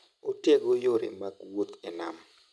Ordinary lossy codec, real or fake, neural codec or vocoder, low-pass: none; real; none; none